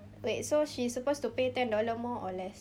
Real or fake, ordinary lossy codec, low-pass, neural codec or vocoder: real; none; 19.8 kHz; none